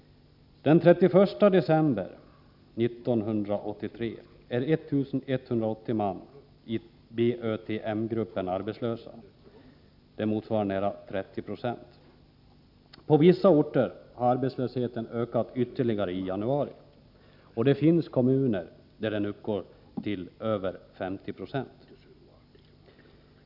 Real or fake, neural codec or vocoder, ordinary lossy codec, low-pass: real; none; none; 5.4 kHz